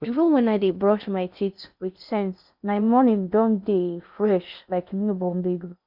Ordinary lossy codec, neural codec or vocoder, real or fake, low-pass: none; codec, 16 kHz in and 24 kHz out, 0.6 kbps, FocalCodec, streaming, 2048 codes; fake; 5.4 kHz